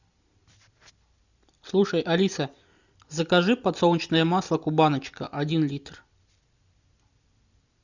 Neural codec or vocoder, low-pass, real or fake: none; 7.2 kHz; real